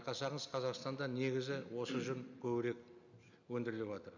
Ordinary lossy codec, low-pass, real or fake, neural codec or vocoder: none; 7.2 kHz; real; none